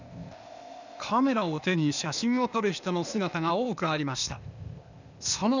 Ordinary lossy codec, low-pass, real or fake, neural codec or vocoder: none; 7.2 kHz; fake; codec, 16 kHz, 0.8 kbps, ZipCodec